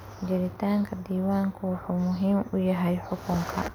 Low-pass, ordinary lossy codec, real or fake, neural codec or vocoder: none; none; real; none